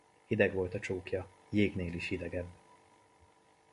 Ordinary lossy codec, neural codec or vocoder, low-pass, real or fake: MP3, 48 kbps; none; 14.4 kHz; real